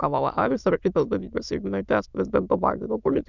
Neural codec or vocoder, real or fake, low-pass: autoencoder, 22.05 kHz, a latent of 192 numbers a frame, VITS, trained on many speakers; fake; 7.2 kHz